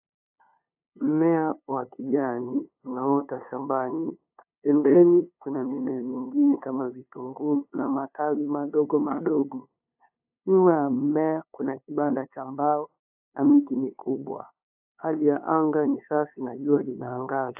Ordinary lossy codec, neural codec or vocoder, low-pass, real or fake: AAC, 32 kbps; codec, 16 kHz, 2 kbps, FunCodec, trained on LibriTTS, 25 frames a second; 3.6 kHz; fake